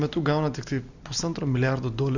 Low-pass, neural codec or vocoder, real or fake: 7.2 kHz; none; real